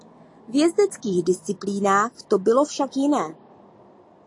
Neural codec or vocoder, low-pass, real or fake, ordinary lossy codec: none; 10.8 kHz; real; AAC, 48 kbps